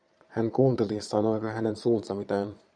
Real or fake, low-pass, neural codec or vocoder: fake; 9.9 kHz; vocoder, 22.05 kHz, 80 mel bands, Vocos